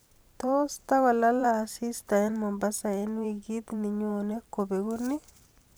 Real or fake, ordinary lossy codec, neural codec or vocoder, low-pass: fake; none; vocoder, 44.1 kHz, 128 mel bands every 512 samples, BigVGAN v2; none